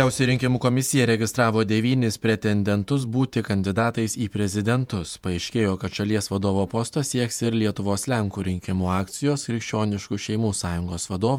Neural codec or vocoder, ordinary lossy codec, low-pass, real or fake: vocoder, 48 kHz, 128 mel bands, Vocos; MP3, 96 kbps; 19.8 kHz; fake